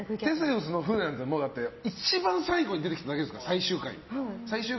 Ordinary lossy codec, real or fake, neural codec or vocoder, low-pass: MP3, 24 kbps; real; none; 7.2 kHz